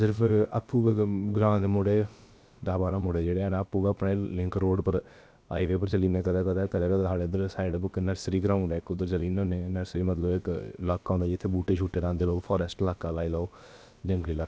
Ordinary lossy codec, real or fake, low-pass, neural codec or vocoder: none; fake; none; codec, 16 kHz, about 1 kbps, DyCAST, with the encoder's durations